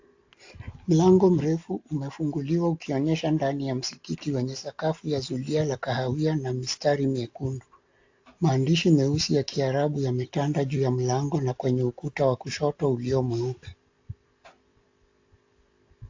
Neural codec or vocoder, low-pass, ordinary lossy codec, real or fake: none; 7.2 kHz; AAC, 48 kbps; real